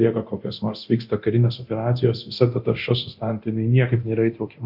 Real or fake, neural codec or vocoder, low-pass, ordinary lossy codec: fake; codec, 24 kHz, 0.9 kbps, DualCodec; 5.4 kHz; Opus, 64 kbps